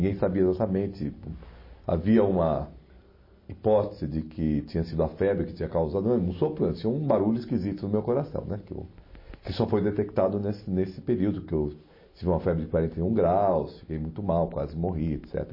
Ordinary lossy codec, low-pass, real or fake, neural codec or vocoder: MP3, 24 kbps; 5.4 kHz; real; none